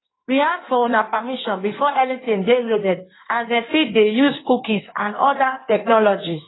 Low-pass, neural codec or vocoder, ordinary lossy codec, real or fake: 7.2 kHz; codec, 16 kHz in and 24 kHz out, 1.1 kbps, FireRedTTS-2 codec; AAC, 16 kbps; fake